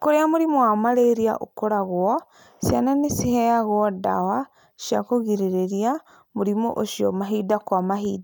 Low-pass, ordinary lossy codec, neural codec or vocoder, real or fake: none; none; none; real